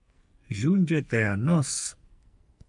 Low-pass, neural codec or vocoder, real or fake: 10.8 kHz; codec, 44.1 kHz, 2.6 kbps, SNAC; fake